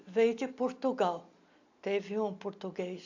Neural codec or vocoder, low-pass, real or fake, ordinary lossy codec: none; 7.2 kHz; real; AAC, 48 kbps